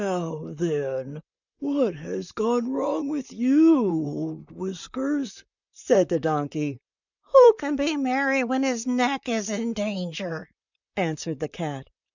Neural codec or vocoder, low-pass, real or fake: vocoder, 44.1 kHz, 128 mel bands, Pupu-Vocoder; 7.2 kHz; fake